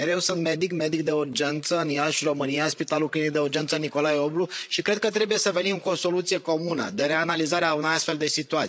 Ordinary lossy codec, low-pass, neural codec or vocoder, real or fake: none; none; codec, 16 kHz, 8 kbps, FreqCodec, larger model; fake